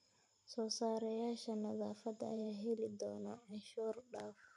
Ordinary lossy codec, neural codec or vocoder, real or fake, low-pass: none; vocoder, 44.1 kHz, 128 mel bands every 512 samples, BigVGAN v2; fake; 10.8 kHz